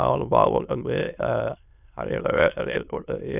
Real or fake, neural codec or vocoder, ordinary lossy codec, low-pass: fake; autoencoder, 22.05 kHz, a latent of 192 numbers a frame, VITS, trained on many speakers; none; 3.6 kHz